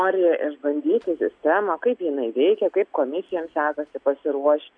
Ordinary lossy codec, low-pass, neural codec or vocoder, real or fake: AAC, 64 kbps; 9.9 kHz; none; real